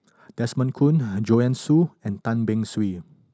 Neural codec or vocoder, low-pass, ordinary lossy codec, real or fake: none; none; none; real